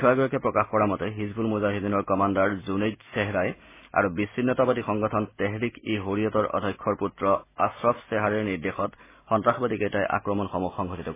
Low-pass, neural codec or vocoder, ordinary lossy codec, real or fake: 3.6 kHz; none; MP3, 16 kbps; real